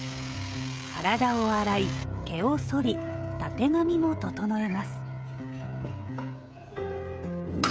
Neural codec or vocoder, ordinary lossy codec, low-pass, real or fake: codec, 16 kHz, 16 kbps, FreqCodec, smaller model; none; none; fake